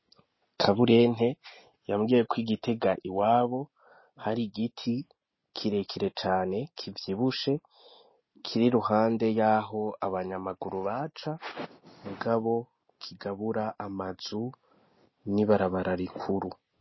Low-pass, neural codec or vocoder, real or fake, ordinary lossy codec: 7.2 kHz; none; real; MP3, 24 kbps